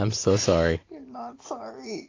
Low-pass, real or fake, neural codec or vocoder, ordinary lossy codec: 7.2 kHz; real; none; AAC, 32 kbps